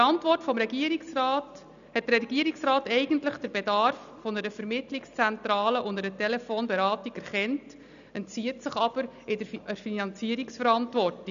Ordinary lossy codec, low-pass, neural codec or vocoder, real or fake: none; 7.2 kHz; none; real